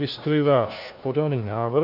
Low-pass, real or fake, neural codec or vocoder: 5.4 kHz; fake; codec, 16 kHz, 1 kbps, FunCodec, trained on LibriTTS, 50 frames a second